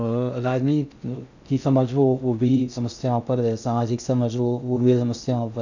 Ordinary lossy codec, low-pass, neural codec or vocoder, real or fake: none; 7.2 kHz; codec, 16 kHz in and 24 kHz out, 0.6 kbps, FocalCodec, streaming, 2048 codes; fake